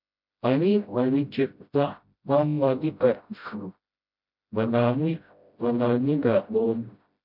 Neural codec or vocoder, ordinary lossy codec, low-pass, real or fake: codec, 16 kHz, 0.5 kbps, FreqCodec, smaller model; MP3, 48 kbps; 5.4 kHz; fake